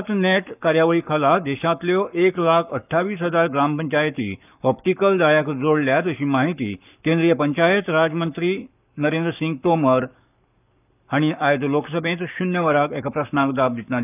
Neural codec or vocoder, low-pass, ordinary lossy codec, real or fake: codec, 44.1 kHz, 7.8 kbps, Pupu-Codec; 3.6 kHz; none; fake